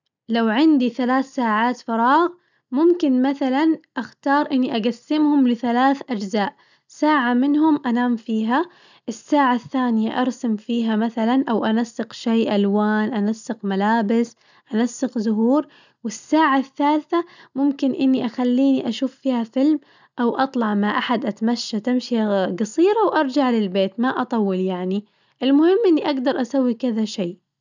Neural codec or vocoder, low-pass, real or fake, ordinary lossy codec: none; 7.2 kHz; real; none